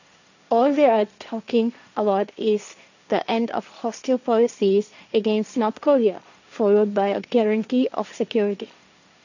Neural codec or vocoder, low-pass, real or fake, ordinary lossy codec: codec, 16 kHz, 1.1 kbps, Voila-Tokenizer; 7.2 kHz; fake; none